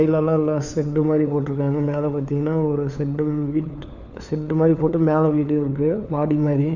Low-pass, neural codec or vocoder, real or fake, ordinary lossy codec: 7.2 kHz; codec, 16 kHz, 4 kbps, FunCodec, trained on LibriTTS, 50 frames a second; fake; none